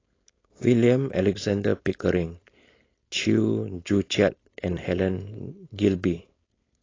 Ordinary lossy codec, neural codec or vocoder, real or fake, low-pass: AAC, 32 kbps; codec, 16 kHz, 4.8 kbps, FACodec; fake; 7.2 kHz